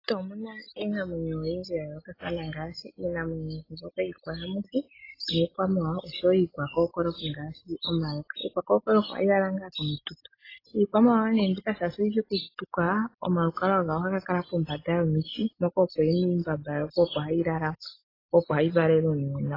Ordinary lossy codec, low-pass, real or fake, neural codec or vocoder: AAC, 24 kbps; 5.4 kHz; real; none